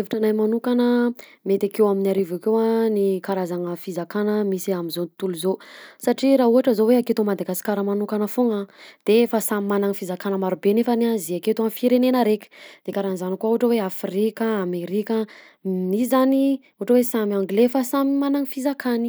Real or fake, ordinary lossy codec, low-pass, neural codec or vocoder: real; none; none; none